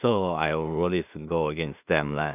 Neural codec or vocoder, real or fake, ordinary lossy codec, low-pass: codec, 16 kHz in and 24 kHz out, 0.4 kbps, LongCat-Audio-Codec, two codebook decoder; fake; none; 3.6 kHz